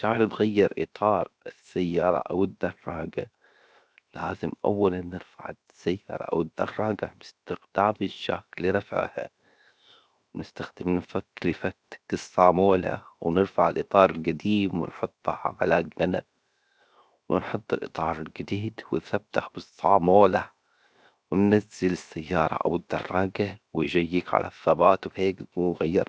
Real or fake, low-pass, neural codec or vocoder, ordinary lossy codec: fake; none; codec, 16 kHz, 0.7 kbps, FocalCodec; none